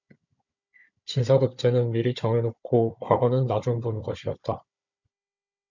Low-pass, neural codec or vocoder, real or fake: 7.2 kHz; codec, 16 kHz, 16 kbps, FunCodec, trained on Chinese and English, 50 frames a second; fake